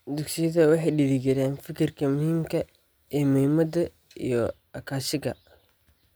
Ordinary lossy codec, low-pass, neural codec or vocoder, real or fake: none; none; none; real